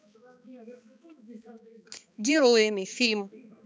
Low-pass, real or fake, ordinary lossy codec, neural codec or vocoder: none; fake; none; codec, 16 kHz, 2 kbps, X-Codec, HuBERT features, trained on balanced general audio